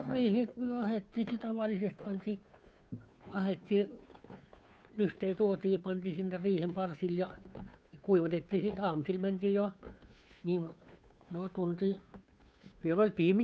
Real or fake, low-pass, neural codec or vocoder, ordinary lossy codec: fake; none; codec, 16 kHz, 2 kbps, FunCodec, trained on Chinese and English, 25 frames a second; none